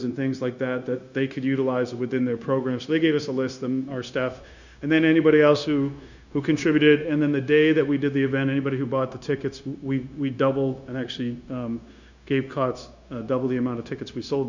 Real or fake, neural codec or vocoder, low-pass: fake; codec, 16 kHz, 0.9 kbps, LongCat-Audio-Codec; 7.2 kHz